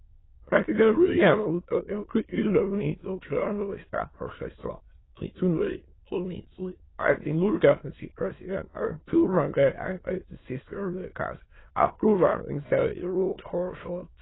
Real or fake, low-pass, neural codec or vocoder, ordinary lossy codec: fake; 7.2 kHz; autoencoder, 22.05 kHz, a latent of 192 numbers a frame, VITS, trained on many speakers; AAC, 16 kbps